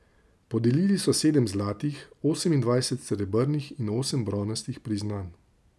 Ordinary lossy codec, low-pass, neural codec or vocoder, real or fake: none; none; none; real